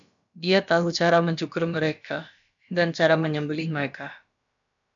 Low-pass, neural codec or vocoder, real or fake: 7.2 kHz; codec, 16 kHz, about 1 kbps, DyCAST, with the encoder's durations; fake